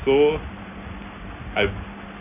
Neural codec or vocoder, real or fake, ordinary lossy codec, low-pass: none; real; none; 3.6 kHz